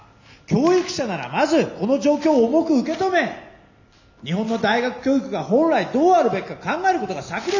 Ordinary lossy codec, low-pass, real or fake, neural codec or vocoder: none; 7.2 kHz; real; none